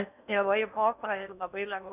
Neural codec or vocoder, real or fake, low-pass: codec, 16 kHz in and 24 kHz out, 0.6 kbps, FocalCodec, streaming, 4096 codes; fake; 3.6 kHz